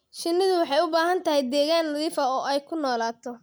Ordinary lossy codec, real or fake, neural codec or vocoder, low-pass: none; real; none; none